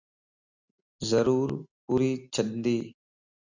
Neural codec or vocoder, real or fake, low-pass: none; real; 7.2 kHz